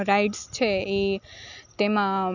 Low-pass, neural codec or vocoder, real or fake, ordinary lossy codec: 7.2 kHz; none; real; none